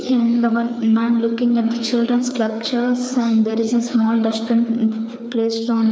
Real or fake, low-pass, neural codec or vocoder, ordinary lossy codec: fake; none; codec, 16 kHz, 4 kbps, FreqCodec, larger model; none